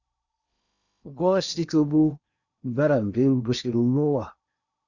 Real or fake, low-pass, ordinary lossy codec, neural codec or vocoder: fake; 7.2 kHz; Opus, 64 kbps; codec, 16 kHz in and 24 kHz out, 0.8 kbps, FocalCodec, streaming, 65536 codes